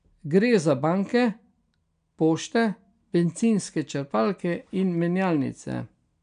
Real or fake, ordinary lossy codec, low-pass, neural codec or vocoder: real; none; 9.9 kHz; none